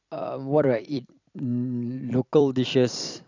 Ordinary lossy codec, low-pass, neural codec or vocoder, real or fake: none; 7.2 kHz; vocoder, 44.1 kHz, 128 mel bands every 256 samples, BigVGAN v2; fake